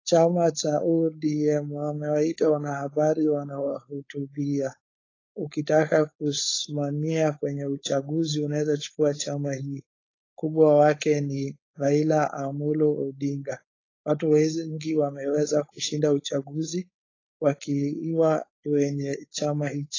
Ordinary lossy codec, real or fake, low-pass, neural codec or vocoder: AAC, 32 kbps; fake; 7.2 kHz; codec, 16 kHz, 4.8 kbps, FACodec